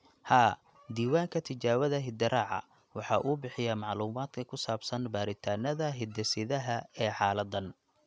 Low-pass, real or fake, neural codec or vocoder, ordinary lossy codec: none; real; none; none